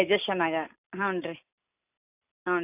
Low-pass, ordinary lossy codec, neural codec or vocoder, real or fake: 3.6 kHz; none; none; real